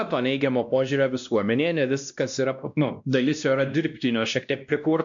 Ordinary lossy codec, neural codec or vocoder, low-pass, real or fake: MP3, 64 kbps; codec, 16 kHz, 1 kbps, X-Codec, WavLM features, trained on Multilingual LibriSpeech; 7.2 kHz; fake